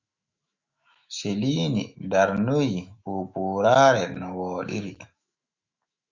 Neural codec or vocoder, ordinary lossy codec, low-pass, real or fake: autoencoder, 48 kHz, 128 numbers a frame, DAC-VAE, trained on Japanese speech; Opus, 64 kbps; 7.2 kHz; fake